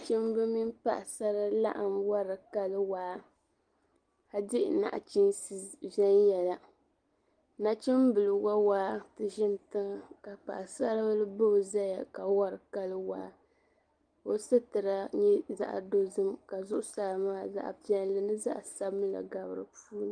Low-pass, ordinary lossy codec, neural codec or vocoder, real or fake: 9.9 kHz; Opus, 24 kbps; none; real